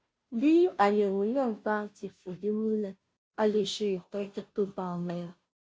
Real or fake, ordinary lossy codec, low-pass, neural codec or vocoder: fake; none; none; codec, 16 kHz, 0.5 kbps, FunCodec, trained on Chinese and English, 25 frames a second